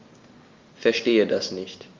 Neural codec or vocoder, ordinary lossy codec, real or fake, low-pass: none; Opus, 32 kbps; real; 7.2 kHz